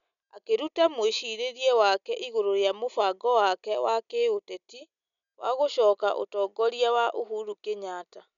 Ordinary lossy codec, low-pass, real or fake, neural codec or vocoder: none; 7.2 kHz; real; none